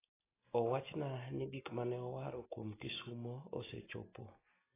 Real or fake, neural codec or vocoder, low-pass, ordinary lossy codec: real; none; 3.6 kHz; AAC, 16 kbps